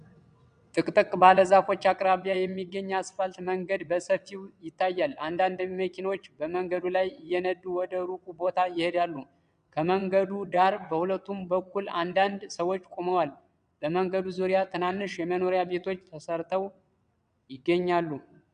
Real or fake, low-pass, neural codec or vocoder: fake; 9.9 kHz; vocoder, 22.05 kHz, 80 mel bands, WaveNeXt